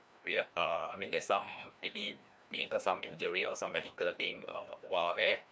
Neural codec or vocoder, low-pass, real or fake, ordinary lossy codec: codec, 16 kHz, 1 kbps, FreqCodec, larger model; none; fake; none